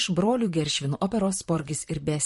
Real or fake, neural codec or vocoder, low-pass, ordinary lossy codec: fake; vocoder, 44.1 kHz, 128 mel bands, Pupu-Vocoder; 14.4 kHz; MP3, 48 kbps